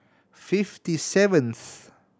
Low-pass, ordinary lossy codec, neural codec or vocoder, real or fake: none; none; none; real